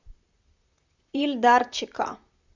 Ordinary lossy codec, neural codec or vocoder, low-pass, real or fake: Opus, 64 kbps; vocoder, 44.1 kHz, 128 mel bands, Pupu-Vocoder; 7.2 kHz; fake